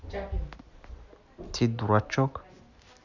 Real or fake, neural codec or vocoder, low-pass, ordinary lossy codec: real; none; 7.2 kHz; none